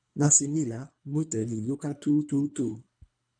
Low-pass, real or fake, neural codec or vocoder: 9.9 kHz; fake; codec, 24 kHz, 3 kbps, HILCodec